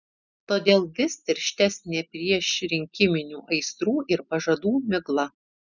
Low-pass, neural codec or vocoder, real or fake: 7.2 kHz; none; real